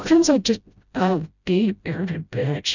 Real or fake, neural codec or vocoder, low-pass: fake; codec, 16 kHz, 0.5 kbps, FreqCodec, smaller model; 7.2 kHz